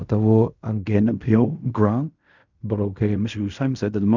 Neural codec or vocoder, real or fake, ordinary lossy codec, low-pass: codec, 16 kHz in and 24 kHz out, 0.4 kbps, LongCat-Audio-Codec, fine tuned four codebook decoder; fake; none; 7.2 kHz